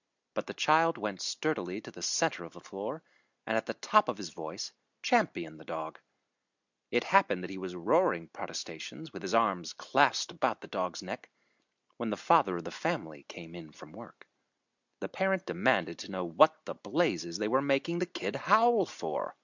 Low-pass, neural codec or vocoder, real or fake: 7.2 kHz; none; real